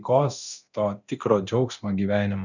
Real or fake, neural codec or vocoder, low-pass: fake; codec, 24 kHz, 0.9 kbps, DualCodec; 7.2 kHz